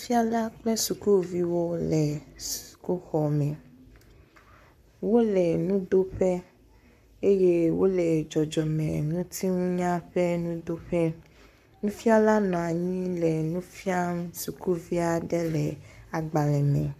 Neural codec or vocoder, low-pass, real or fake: codec, 44.1 kHz, 7.8 kbps, Pupu-Codec; 14.4 kHz; fake